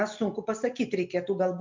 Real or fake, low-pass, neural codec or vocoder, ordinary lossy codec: real; 7.2 kHz; none; MP3, 64 kbps